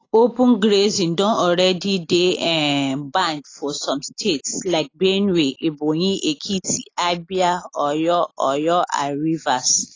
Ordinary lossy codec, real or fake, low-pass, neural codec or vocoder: AAC, 32 kbps; real; 7.2 kHz; none